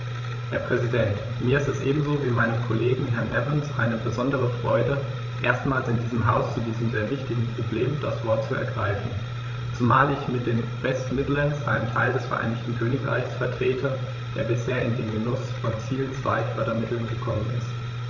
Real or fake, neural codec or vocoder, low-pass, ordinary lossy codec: fake; codec, 16 kHz, 16 kbps, FreqCodec, larger model; 7.2 kHz; none